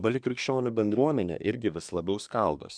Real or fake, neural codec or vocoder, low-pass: fake; codec, 24 kHz, 1 kbps, SNAC; 9.9 kHz